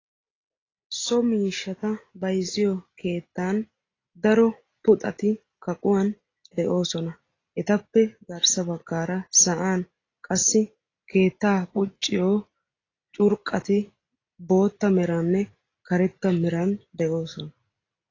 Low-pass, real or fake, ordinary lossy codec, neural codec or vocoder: 7.2 kHz; real; AAC, 32 kbps; none